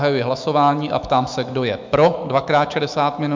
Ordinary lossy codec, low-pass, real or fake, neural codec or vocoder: MP3, 64 kbps; 7.2 kHz; fake; autoencoder, 48 kHz, 128 numbers a frame, DAC-VAE, trained on Japanese speech